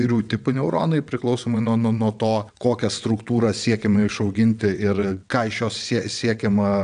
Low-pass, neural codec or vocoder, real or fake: 9.9 kHz; vocoder, 22.05 kHz, 80 mel bands, WaveNeXt; fake